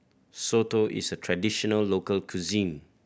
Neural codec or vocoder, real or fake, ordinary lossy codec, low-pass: none; real; none; none